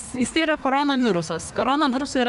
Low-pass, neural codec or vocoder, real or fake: 10.8 kHz; codec, 24 kHz, 1 kbps, SNAC; fake